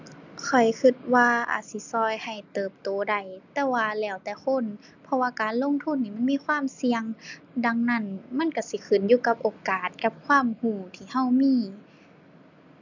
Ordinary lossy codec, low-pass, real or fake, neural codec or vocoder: none; 7.2 kHz; real; none